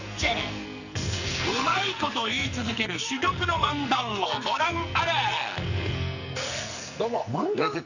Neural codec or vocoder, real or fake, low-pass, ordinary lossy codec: codec, 44.1 kHz, 2.6 kbps, SNAC; fake; 7.2 kHz; none